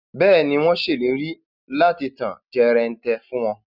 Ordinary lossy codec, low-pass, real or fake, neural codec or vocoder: none; 5.4 kHz; real; none